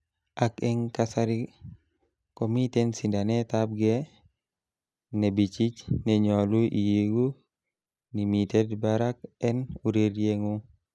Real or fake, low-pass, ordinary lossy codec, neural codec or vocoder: real; none; none; none